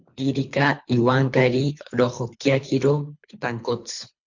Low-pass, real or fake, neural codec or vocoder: 7.2 kHz; fake; codec, 24 kHz, 3 kbps, HILCodec